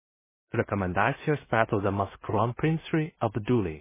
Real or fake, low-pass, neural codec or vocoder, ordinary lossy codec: fake; 3.6 kHz; codec, 16 kHz in and 24 kHz out, 0.4 kbps, LongCat-Audio-Codec, two codebook decoder; MP3, 16 kbps